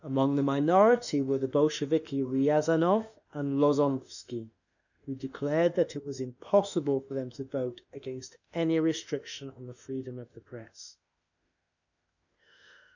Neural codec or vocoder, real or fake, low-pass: autoencoder, 48 kHz, 32 numbers a frame, DAC-VAE, trained on Japanese speech; fake; 7.2 kHz